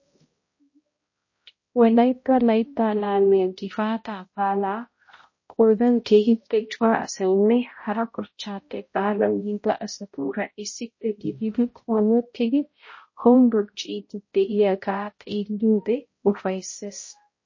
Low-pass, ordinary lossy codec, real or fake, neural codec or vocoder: 7.2 kHz; MP3, 32 kbps; fake; codec, 16 kHz, 0.5 kbps, X-Codec, HuBERT features, trained on balanced general audio